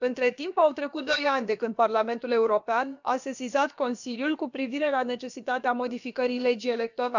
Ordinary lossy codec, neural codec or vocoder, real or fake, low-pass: none; codec, 16 kHz, about 1 kbps, DyCAST, with the encoder's durations; fake; 7.2 kHz